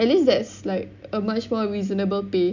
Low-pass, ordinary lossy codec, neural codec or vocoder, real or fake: 7.2 kHz; none; none; real